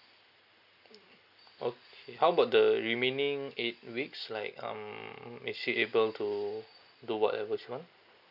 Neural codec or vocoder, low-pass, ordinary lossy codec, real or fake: none; 5.4 kHz; none; real